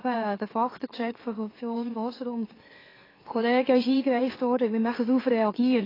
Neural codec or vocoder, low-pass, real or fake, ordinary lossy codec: autoencoder, 44.1 kHz, a latent of 192 numbers a frame, MeloTTS; 5.4 kHz; fake; AAC, 24 kbps